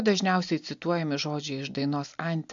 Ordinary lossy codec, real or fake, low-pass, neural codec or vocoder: AAC, 64 kbps; real; 7.2 kHz; none